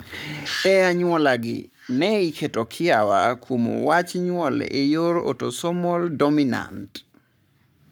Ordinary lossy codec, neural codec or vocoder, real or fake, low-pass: none; codec, 44.1 kHz, 7.8 kbps, Pupu-Codec; fake; none